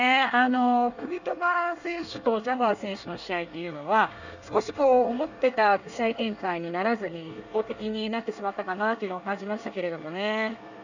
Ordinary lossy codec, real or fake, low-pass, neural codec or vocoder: none; fake; 7.2 kHz; codec, 24 kHz, 1 kbps, SNAC